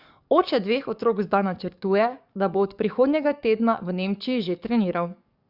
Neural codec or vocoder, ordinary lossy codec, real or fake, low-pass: codec, 44.1 kHz, 7.8 kbps, DAC; Opus, 64 kbps; fake; 5.4 kHz